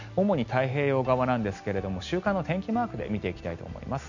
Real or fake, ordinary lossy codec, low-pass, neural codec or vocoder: real; none; 7.2 kHz; none